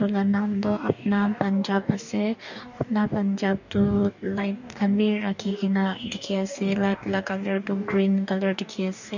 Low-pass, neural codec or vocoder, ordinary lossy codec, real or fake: 7.2 kHz; codec, 44.1 kHz, 2.6 kbps, DAC; none; fake